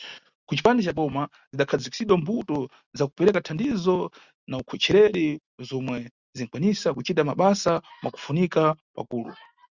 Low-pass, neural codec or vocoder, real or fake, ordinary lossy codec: 7.2 kHz; none; real; Opus, 64 kbps